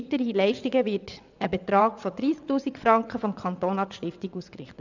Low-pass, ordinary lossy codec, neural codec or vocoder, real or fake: 7.2 kHz; none; vocoder, 22.05 kHz, 80 mel bands, WaveNeXt; fake